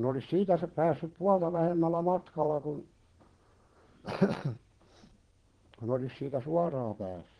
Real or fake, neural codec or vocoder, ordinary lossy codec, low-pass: fake; vocoder, 22.05 kHz, 80 mel bands, Vocos; Opus, 16 kbps; 9.9 kHz